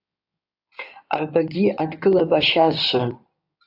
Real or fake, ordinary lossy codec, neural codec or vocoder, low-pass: fake; AAC, 48 kbps; codec, 16 kHz in and 24 kHz out, 2.2 kbps, FireRedTTS-2 codec; 5.4 kHz